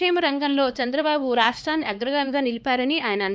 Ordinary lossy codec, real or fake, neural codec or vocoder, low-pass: none; fake; codec, 16 kHz, 2 kbps, X-Codec, HuBERT features, trained on LibriSpeech; none